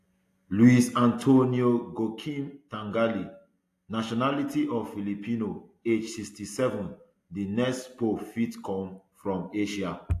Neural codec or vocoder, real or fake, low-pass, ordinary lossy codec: none; real; 14.4 kHz; AAC, 64 kbps